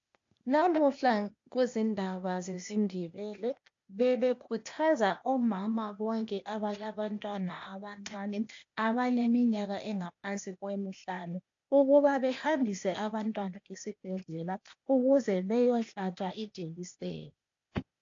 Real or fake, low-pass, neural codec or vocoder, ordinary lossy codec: fake; 7.2 kHz; codec, 16 kHz, 0.8 kbps, ZipCodec; AAC, 48 kbps